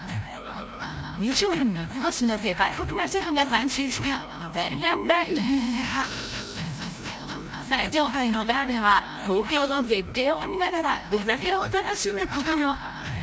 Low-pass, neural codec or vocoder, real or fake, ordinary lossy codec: none; codec, 16 kHz, 0.5 kbps, FreqCodec, larger model; fake; none